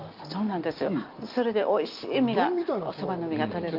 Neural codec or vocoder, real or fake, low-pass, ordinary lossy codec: none; real; 5.4 kHz; Opus, 32 kbps